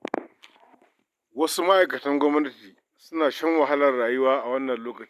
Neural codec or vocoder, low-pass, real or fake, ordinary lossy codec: none; 14.4 kHz; real; none